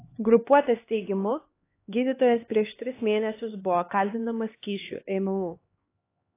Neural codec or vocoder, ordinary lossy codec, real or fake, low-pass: codec, 16 kHz, 2 kbps, X-Codec, HuBERT features, trained on LibriSpeech; AAC, 24 kbps; fake; 3.6 kHz